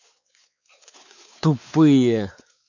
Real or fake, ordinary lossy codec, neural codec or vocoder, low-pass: fake; none; codec, 16 kHz, 4 kbps, X-Codec, WavLM features, trained on Multilingual LibriSpeech; 7.2 kHz